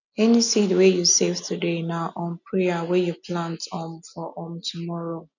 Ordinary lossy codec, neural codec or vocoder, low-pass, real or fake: none; none; 7.2 kHz; real